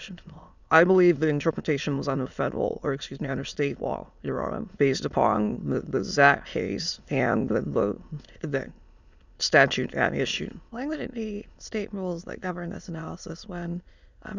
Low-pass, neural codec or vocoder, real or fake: 7.2 kHz; autoencoder, 22.05 kHz, a latent of 192 numbers a frame, VITS, trained on many speakers; fake